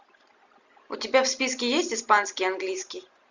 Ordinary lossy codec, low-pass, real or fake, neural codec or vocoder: Opus, 64 kbps; 7.2 kHz; real; none